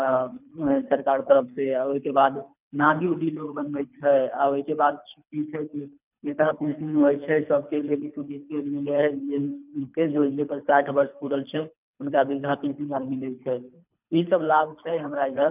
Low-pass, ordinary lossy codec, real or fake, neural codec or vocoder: 3.6 kHz; none; fake; codec, 24 kHz, 3 kbps, HILCodec